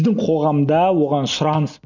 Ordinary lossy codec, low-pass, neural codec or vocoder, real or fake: none; 7.2 kHz; none; real